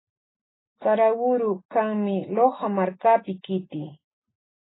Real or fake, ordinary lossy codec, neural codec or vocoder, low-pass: real; AAC, 16 kbps; none; 7.2 kHz